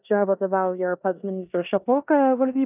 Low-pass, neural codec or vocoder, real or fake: 3.6 kHz; codec, 16 kHz in and 24 kHz out, 0.9 kbps, LongCat-Audio-Codec, four codebook decoder; fake